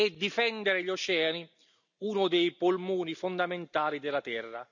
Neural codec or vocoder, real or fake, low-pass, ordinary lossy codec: none; real; 7.2 kHz; none